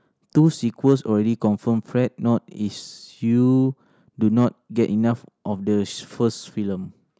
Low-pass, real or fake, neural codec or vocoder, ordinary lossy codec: none; real; none; none